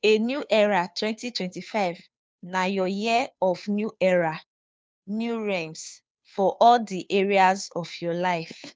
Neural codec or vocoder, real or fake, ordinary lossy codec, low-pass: codec, 16 kHz, 2 kbps, FunCodec, trained on Chinese and English, 25 frames a second; fake; none; none